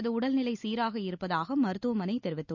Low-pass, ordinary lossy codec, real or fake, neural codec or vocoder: 7.2 kHz; none; real; none